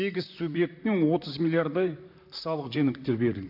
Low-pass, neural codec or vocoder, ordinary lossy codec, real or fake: 5.4 kHz; vocoder, 44.1 kHz, 128 mel bands, Pupu-Vocoder; AAC, 48 kbps; fake